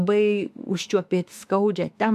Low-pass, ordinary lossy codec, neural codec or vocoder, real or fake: 14.4 kHz; AAC, 96 kbps; autoencoder, 48 kHz, 32 numbers a frame, DAC-VAE, trained on Japanese speech; fake